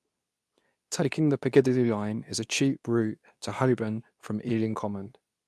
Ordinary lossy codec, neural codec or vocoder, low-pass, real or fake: none; codec, 24 kHz, 0.9 kbps, WavTokenizer, medium speech release version 2; none; fake